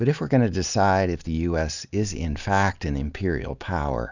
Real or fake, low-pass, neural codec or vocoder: real; 7.2 kHz; none